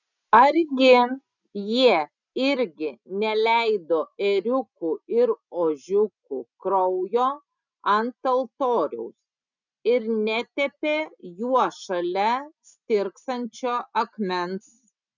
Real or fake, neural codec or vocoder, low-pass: real; none; 7.2 kHz